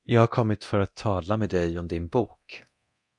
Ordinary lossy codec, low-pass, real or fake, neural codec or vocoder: MP3, 96 kbps; 10.8 kHz; fake; codec, 24 kHz, 0.9 kbps, DualCodec